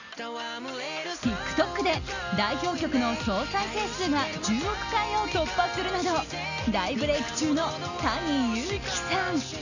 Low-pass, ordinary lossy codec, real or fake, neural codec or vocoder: 7.2 kHz; none; real; none